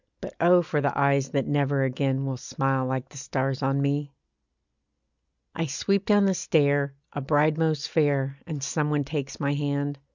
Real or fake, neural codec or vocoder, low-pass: real; none; 7.2 kHz